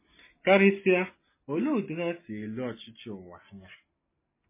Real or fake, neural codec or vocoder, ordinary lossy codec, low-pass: real; none; MP3, 16 kbps; 3.6 kHz